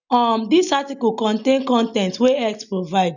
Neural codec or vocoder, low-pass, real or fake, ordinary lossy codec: none; 7.2 kHz; real; none